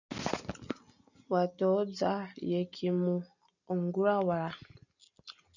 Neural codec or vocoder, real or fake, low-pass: none; real; 7.2 kHz